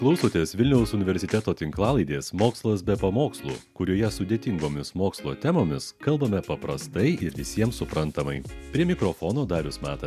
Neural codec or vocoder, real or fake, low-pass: none; real; 14.4 kHz